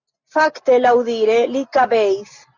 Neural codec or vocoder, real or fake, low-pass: none; real; 7.2 kHz